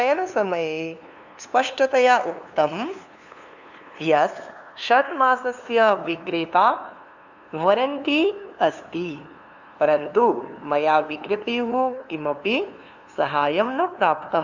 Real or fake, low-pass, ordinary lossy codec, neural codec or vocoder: fake; 7.2 kHz; none; codec, 16 kHz, 2 kbps, FunCodec, trained on LibriTTS, 25 frames a second